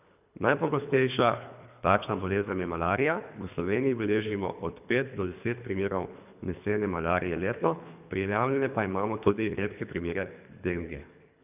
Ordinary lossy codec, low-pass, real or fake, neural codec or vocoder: none; 3.6 kHz; fake; codec, 24 kHz, 3 kbps, HILCodec